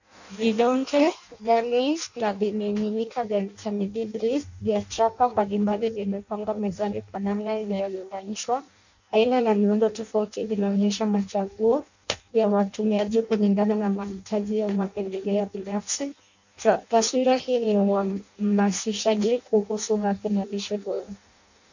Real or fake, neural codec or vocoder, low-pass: fake; codec, 16 kHz in and 24 kHz out, 0.6 kbps, FireRedTTS-2 codec; 7.2 kHz